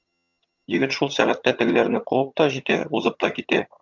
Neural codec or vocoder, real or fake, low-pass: vocoder, 22.05 kHz, 80 mel bands, HiFi-GAN; fake; 7.2 kHz